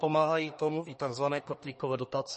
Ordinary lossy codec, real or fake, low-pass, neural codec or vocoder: MP3, 32 kbps; fake; 10.8 kHz; codec, 24 kHz, 1 kbps, SNAC